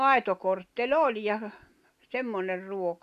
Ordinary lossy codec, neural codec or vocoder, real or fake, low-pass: none; none; real; 14.4 kHz